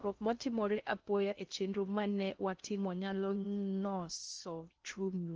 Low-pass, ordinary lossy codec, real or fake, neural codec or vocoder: 7.2 kHz; Opus, 24 kbps; fake; codec, 16 kHz in and 24 kHz out, 0.6 kbps, FocalCodec, streaming, 2048 codes